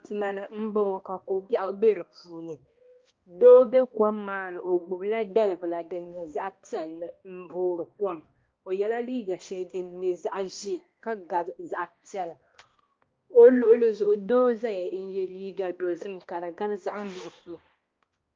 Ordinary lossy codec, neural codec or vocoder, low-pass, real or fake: Opus, 24 kbps; codec, 16 kHz, 1 kbps, X-Codec, HuBERT features, trained on balanced general audio; 7.2 kHz; fake